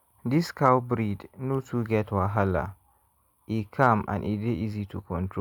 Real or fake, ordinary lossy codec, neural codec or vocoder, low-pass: real; none; none; 19.8 kHz